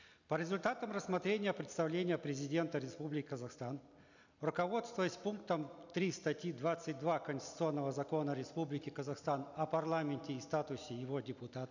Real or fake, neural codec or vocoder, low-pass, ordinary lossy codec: real; none; 7.2 kHz; none